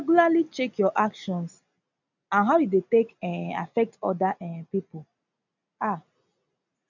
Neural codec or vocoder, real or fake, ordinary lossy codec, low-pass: none; real; none; 7.2 kHz